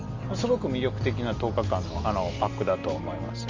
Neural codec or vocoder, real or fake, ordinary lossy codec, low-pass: none; real; Opus, 32 kbps; 7.2 kHz